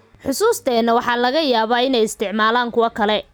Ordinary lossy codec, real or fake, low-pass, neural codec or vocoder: none; real; none; none